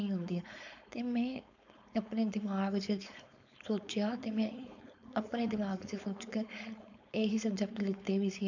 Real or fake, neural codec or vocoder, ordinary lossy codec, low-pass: fake; codec, 16 kHz, 4.8 kbps, FACodec; none; 7.2 kHz